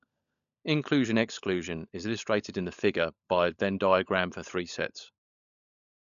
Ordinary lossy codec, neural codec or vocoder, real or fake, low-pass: none; codec, 16 kHz, 16 kbps, FunCodec, trained on LibriTTS, 50 frames a second; fake; 7.2 kHz